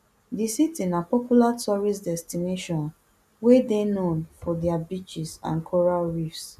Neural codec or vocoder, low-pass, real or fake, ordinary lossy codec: none; 14.4 kHz; real; none